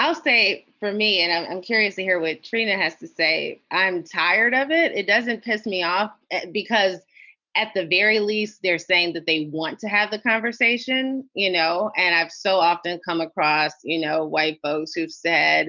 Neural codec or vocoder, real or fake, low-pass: none; real; 7.2 kHz